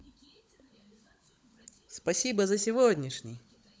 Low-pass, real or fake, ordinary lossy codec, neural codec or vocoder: none; fake; none; codec, 16 kHz, 16 kbps, FunCodec, trained on LibriTTS, 50 frames a second